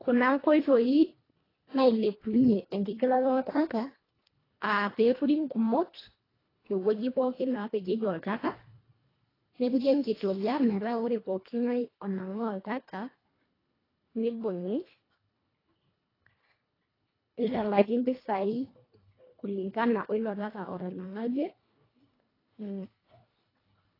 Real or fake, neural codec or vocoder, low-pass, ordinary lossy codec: fake; codec, 24 kHz, 1.5 kbps, HILCodec; 5.4 kHz; AAC, 24 kbps